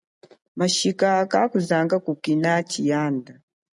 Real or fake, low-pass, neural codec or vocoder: real; 10.8 kHz; none